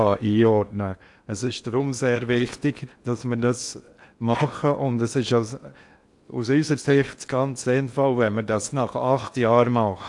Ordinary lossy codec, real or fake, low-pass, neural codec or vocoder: AAC, 64 kbps; fake; 10.8 kHz; codec, 16 kHz in and 24 kHz out, 0.8 kbps, FocalCodec, streaming, 65536 codes